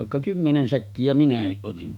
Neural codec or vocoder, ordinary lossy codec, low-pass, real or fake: autoencoder, 48 kHz, 32 numbers a frame, DAC-VAE, trained on Japanese speech; none; 19.8 kHz; fake